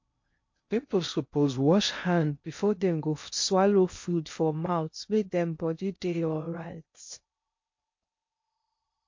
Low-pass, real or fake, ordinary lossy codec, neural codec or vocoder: 7.2 kHz; fake; MP3, 48 kbps; codec, 16 kHz in and 24 kHz out, 0.6 kbps, FocalCodec, streaming, 2048 codes